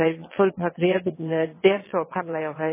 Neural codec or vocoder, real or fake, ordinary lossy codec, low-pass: vocoder, 22.05 kHz, 80 mel bands, WaveNeXt; fake; MP3, 16 kbps; 3.6 kHz